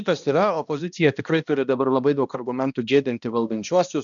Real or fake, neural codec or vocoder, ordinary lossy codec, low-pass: fake; codec, 16 kHz, 1 kbps, X-Codec, HuBERT features, trained on balanced general audio; MP3, 96 kbps; 7.2 kHz